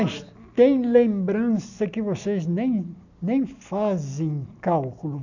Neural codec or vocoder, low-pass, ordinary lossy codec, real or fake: none; 7.2 kHz; none; real